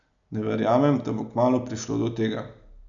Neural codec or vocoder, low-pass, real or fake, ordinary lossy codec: none; 7.2 kHz; real; none